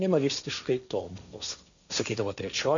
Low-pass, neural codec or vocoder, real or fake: 7.2 kHz; codec, 16 kHz, 1.1 kbps, Voila-Tokenizer; fake